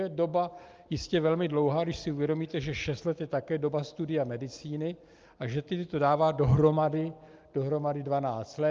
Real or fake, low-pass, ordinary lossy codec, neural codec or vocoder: real; 7.2 kHz; Opus, 24 kbps; none